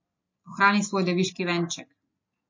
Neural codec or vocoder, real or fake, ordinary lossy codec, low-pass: none; real; MP3, 32 kbps; 7.2 kHz